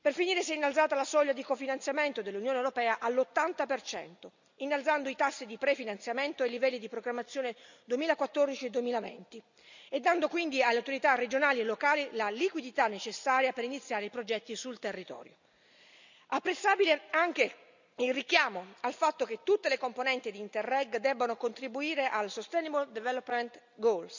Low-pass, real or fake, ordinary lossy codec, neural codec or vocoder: 7.2 kHz; real; none; none